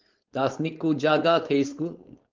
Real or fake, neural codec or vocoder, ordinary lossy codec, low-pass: fake; codec, 16 kHz, 4.8 kbps, FACodec; Opus, 32 kbps; 7.2 kHz